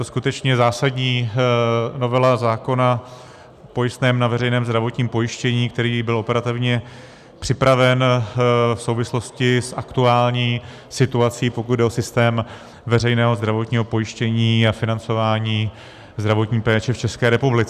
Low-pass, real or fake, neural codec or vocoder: 14.4 kHz; real; none